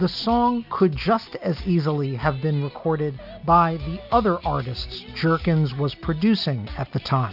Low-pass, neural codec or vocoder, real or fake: 5.4 kHz; none; real